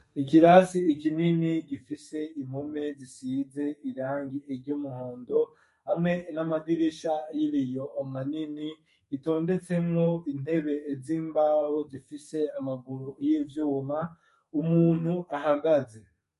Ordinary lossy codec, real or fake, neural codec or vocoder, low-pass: MP3, 48 kbps; fake; codec, 32 kHz, 1.9 kbps, SNAC; 14.4 kHz